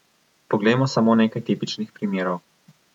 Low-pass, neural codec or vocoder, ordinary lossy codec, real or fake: 19.8 kHz; vocoder, 48 kHz, 128 mel bands, Vocos; none; fake